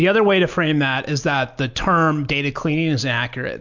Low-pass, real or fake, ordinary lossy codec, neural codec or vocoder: 7.2 kHz; real; MP3, 64 kbps; none